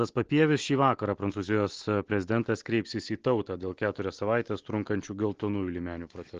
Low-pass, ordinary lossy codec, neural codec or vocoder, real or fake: 7.2 kHz; Opus, 16 kbps; none; real